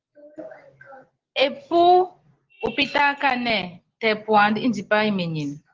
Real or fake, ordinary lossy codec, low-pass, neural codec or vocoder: real; Opus, 16 kbps; 7.2 kHz; none